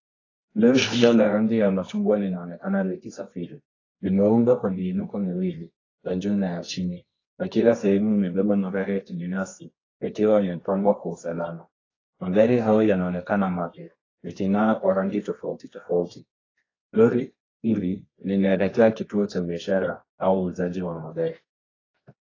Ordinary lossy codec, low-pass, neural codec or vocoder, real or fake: AAC, 32 kbps; 7.2 kHz; codec, 24 kHz, 0.9 kbps, WavTokenizer, medium music audio release; fake